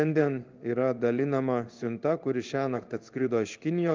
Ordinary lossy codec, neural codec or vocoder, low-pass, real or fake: Opus, 24 kbps; codec, 16 kHz in and 24 kHz out, 1 kbps, XY-Tokenizer; 7.2 kHz; fake